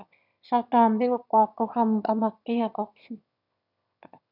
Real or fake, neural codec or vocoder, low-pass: fake; autoencoder, 22.05 kHz, a latent of 192 numbers a frame, VITS, trained on one speaker; 5.4 kHz